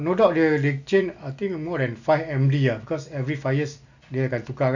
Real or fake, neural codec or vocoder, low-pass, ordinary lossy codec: real; none; 7.2 kHz; none